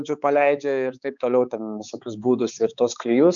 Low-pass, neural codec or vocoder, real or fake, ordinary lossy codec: 7.2 kHz; codec, 16 kHz, 4 kbps, X-Codec, HuBERT features, trained on balanced general audio; fake; MP3, 96 kbps